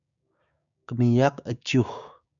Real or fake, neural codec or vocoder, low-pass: fake; codec, 16 kHz, 6 kbps, DAC; 7.2 kHz